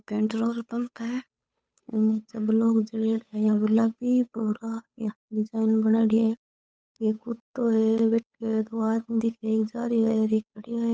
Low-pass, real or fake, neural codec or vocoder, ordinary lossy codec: none; fake; codec, 16 kHz, 8 kbps, FunCodec, trained on Chinese and English, 25 frames a second; none